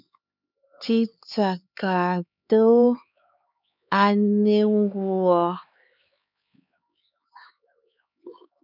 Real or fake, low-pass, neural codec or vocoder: fake; 5.4 kHz; codec, 16 kHz, 4 kbps, X-Codec, HuBERT features, trained on LibriSpeech